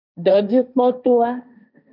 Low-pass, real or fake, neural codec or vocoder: 5.4 kHz; fake; codec, 16 kHz, 1.1 kbps, Voila-Tokenizer